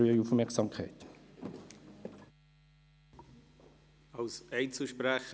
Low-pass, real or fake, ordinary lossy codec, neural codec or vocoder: none; real; none; none